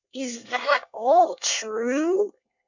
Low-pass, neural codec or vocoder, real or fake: 7.2 kHz; codec, 24 kHz, 1 kbps, SNAC; fake